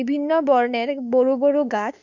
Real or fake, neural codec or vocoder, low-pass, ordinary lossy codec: fake; autoencoder, 48 kHz, 32 numbers a frame, DAC-VAE, trained on Japanese speech; 7.2 kHz; none